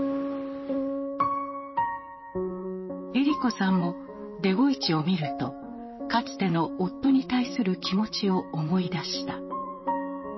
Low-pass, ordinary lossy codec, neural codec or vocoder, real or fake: 7.2 kHz; MP3, 24 kbps; vocoder, 44.1 kHz, 128 mel bands, Pupu-Vocoder; fake